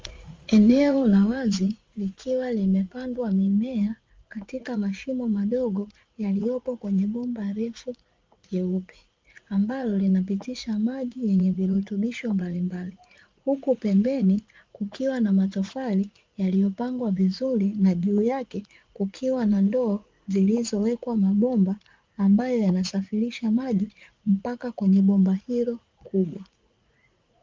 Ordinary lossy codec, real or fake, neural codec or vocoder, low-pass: Opus, 32 kbps; fake; vocoder, 44.1 kHz, 80 mel bands, Vocos; 7.2 kHz